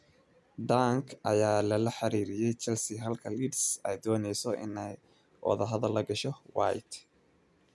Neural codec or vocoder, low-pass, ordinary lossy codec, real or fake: none; none; none; real